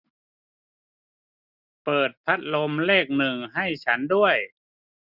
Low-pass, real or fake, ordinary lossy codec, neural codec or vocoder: 5.4 kHz; real; none; none